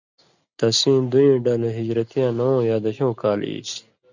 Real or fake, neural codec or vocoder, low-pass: real; none; 7.2 kHz